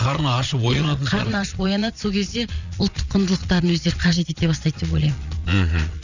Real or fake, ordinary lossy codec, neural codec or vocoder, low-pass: fake; none; vocoder, 22.05 kHz, 80 mel bands, Vocos; 7.2 kHz